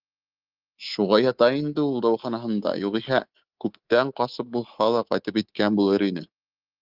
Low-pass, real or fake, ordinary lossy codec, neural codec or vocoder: 5.4 kHz; fake; Opus, 32 kbps; codec, 24 kHz, 3.1 kbps, DualCodec